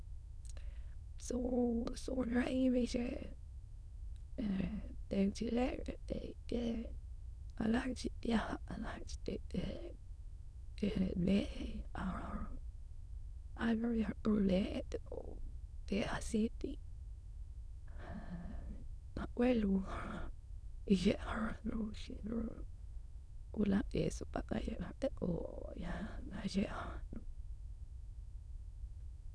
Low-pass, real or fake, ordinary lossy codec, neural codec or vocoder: none; fake; none; autoencoder, 22.05 kHz, a latent of 192 numbers a frame, VITS, trained on many speakers